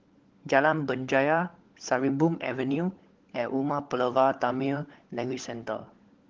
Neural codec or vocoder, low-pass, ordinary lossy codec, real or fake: codec, 16 kHz, 16 kbps, FunCodec, trained on LibriTTS, 50 frames a second; 7.2 kHz; Opus, 16 kbps; fake